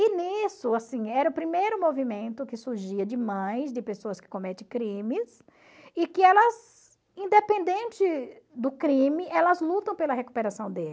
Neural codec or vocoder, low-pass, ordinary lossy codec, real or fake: none; none; none; real